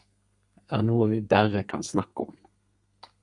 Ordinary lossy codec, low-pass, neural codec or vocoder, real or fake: Opus, 64 kbps; 10.8 kHz; codec, 32 kHz, 1.9 kbps, SNAC; fake